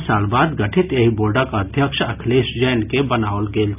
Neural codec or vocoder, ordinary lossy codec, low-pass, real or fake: none; none; 3.6 kHz; real